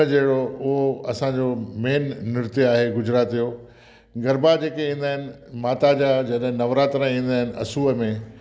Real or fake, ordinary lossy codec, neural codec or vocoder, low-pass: real; none; none; none